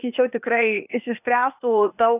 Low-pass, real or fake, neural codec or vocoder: 3.6 kHz; fake; codec, 16 kHz, 0.8 kbps, ZipCodec